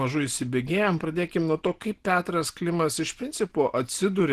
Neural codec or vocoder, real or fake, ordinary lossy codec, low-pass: none; real; Opus, 16 kbps; 14.4 kHz